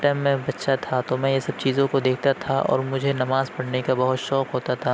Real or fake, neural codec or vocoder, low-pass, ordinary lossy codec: real; none; none; none